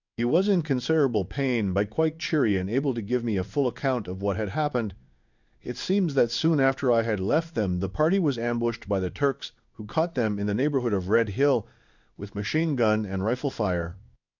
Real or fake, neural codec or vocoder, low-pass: fake; codec, 16 kHz in and 24 kHz out, 1 kbps, XY-Tokenizer; 7.2 kHz